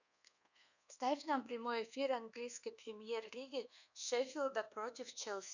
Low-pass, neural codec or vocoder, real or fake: 7.2 kHz; codec, 24 kHz, 1.2 kbps, DualCodec; fake